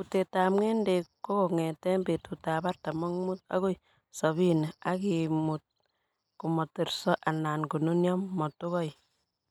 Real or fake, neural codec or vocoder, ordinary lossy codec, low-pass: real; none; none; 19.8 kHz